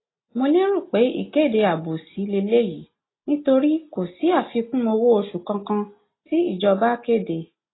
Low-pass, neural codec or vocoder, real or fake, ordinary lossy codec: 7.2 kHz; none; real; AAC, 16 kbps